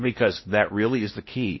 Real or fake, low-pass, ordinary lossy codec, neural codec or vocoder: fake; 7.2 kHz; MP3, 24 kbps; codec, 16 kHz in and 24 kHz out, 0.6 kbps, FocalCodec, streaming, 4096 codes